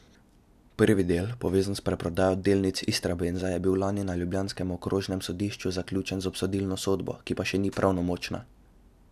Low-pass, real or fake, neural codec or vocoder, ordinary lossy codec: 14.4 kHz; real; none; none